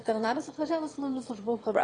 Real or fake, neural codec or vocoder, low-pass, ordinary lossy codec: fake; autoencoder, 22.05 kHz, a latent of 192 numbers a frame, VITS, trained on one speaker; 9.9 kHz; AAC, 32 kbps